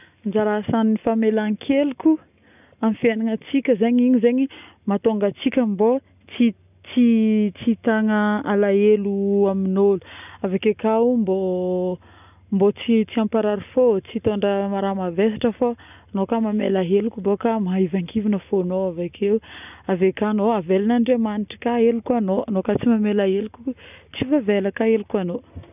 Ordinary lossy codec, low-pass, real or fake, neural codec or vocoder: none; 3.6 kHz; real; none